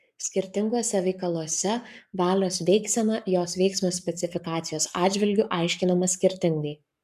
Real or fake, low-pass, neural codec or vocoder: fake; 14.4 kHz; codec, 44.1 kHz, 7.8 kbps, Pupu-Codec